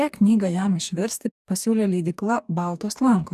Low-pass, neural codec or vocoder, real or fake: 14.4 kHz; codec, 44.1 kHz, 2.6 kbps, DAC; fake